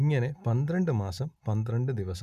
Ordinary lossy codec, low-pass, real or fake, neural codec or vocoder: none; 14.4 kHz; real; none